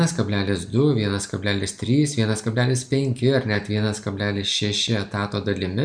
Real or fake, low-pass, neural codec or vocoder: real; 9.9 kHz; none